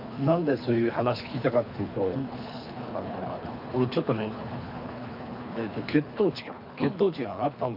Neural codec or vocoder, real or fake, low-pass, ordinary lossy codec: codec, 24 kHz, 3 kbps, HILCodec; fake; 5.4 kHz; MP3, 48 kbps